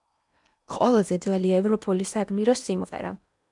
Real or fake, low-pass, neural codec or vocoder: fake; 10.8 kHz; codec, 16 kHz in and 24 kHz out, 0.8 kbps, FocalCodec, streaming, 65536 codes